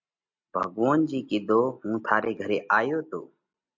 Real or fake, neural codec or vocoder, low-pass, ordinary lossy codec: real; none; 7.2 kHz; MP3, 48 kbps